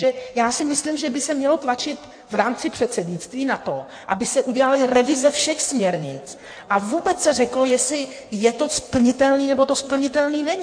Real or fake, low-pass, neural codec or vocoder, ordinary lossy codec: fake; 9.9 kHz; codec, 16 kHz in and 24 kHz out, 1.1 kbps, FireRedTTS-2 codec; AAC, 64 kbps